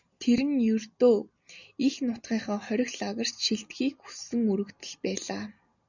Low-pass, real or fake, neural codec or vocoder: 7.2 kHz; real; none